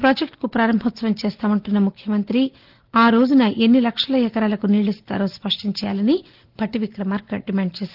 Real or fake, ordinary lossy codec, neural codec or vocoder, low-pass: real; Opus, 16 kbps; none; 5.4 kHz